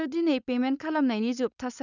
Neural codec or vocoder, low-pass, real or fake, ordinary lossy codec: codec, 16 kHz, 4.8 kbps, FACodec; 7.2 kHz; fake; none